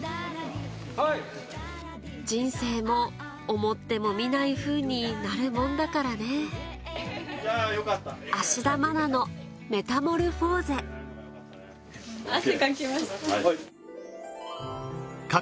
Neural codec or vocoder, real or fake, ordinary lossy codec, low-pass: none; real; none; none